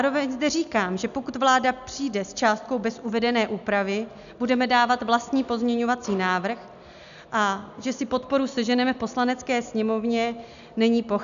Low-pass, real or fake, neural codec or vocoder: 7.2 kHz; real; none